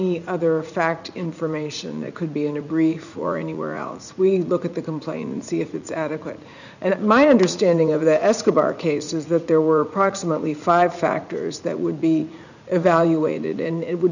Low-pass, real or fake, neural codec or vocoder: 7.2 kHz; fake; vocoder, 44.1 kHz, 80 mel bands, Vocos